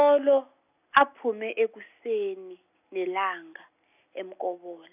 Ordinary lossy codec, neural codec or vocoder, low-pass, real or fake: none; none; 3.6 kHz; real